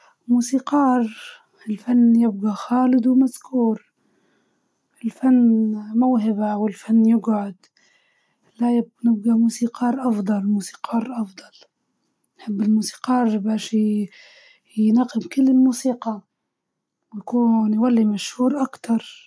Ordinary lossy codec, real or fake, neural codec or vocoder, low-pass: none; real; none; none